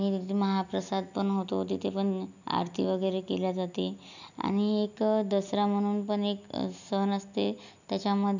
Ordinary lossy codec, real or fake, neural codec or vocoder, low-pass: none; real; none; 7.2 kHz